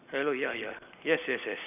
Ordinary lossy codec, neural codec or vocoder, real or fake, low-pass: none; none; real; 3.6 kHz